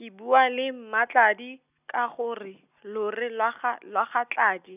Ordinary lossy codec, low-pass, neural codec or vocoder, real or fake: none; 3.6 kHz; none; real